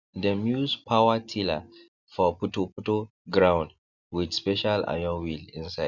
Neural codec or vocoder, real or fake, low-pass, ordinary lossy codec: none; real; 7.2 kHz; none